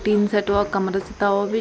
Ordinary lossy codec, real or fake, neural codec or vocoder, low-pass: none; real; none; none